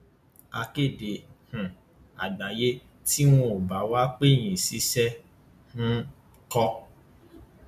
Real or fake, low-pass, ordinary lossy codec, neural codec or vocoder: real; 14.4 kHz; none; none